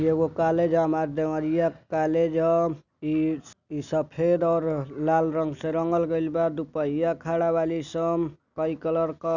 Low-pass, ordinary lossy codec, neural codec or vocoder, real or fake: 7.2 kHz; none; none; real